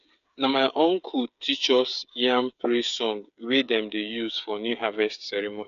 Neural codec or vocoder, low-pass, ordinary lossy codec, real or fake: codec, 16 kHz, 8 kbps, FreqCodec, smaller model; 7.2 kHz; none; fake